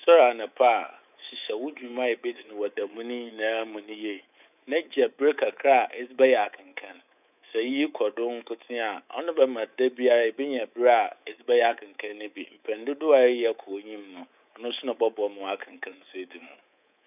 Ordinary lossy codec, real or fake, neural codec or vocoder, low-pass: none; fake; codec, 24 kHz, 3.1 kbps, DualCodec; 3.6 kHz